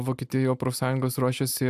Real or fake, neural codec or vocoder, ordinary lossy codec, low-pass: real; none; AAC, 96 kbps; 14.4 kHz